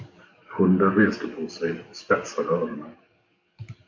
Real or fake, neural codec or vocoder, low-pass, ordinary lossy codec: fake; codec, 44.1 kHz, 7.8 kbps, DAC; 7.2 kHz; MP3, 48 kbps